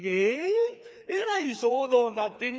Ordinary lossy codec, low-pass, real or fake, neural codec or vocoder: none; none; fake; codec, 16 kHz, 2 kbps, FreqCodec, larger model